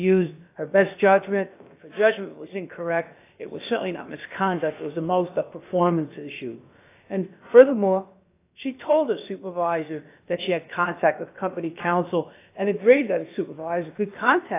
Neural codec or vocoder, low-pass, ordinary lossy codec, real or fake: codec, 16 kHz, about 1 kbps, DyCAST, with the encoder's durations; 3.6 kHz; AAC, 24 kbps; fake